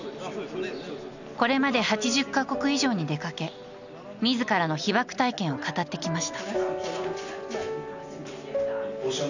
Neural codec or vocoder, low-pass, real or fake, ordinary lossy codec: none; 7.2 kHz; real; none